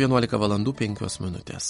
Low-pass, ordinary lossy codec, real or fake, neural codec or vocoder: 19.8 kHz; MP3, 48 kbps; real; none